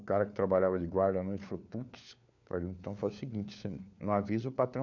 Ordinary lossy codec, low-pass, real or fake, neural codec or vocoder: none; 7.2 kHz; fake; codec, 16 kHz, 4 kbps, FunCodec, trained on LibriTTS, 50 frames a second